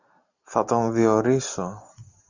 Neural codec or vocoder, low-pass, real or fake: none; 7.2 kHz; real